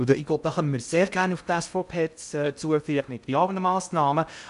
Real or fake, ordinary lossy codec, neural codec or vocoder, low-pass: fake; AAC, 96 kbps; codec, 16 kHz in and 24 kHz out, 0.6 kbps, FocalCodec, streaming, 2048 codes; 10.8 kHz